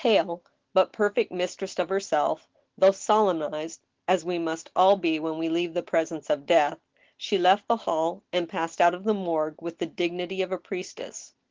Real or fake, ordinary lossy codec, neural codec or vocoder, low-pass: real; Opus, 16 kbps; none; 7.2 kHz